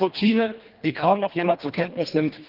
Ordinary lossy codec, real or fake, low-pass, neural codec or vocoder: Opus, 32 kbps; fake; 5.4 kHz; codec, 24 kHz, 1.5 kbps, HILCodec